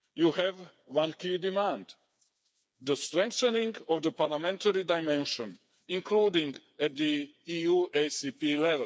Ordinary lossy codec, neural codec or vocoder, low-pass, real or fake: none; codec, 16 kHz, 4 kbps, FreqCodec, smaller model; none; fake